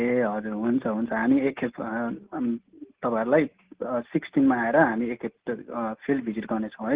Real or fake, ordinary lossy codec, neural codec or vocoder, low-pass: real; Opus, 16 kbps; none; 3.6 kHz